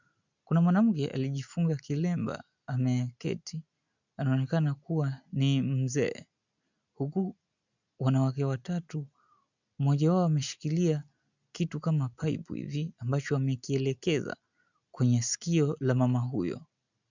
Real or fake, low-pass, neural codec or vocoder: real; 7.2 kHz; none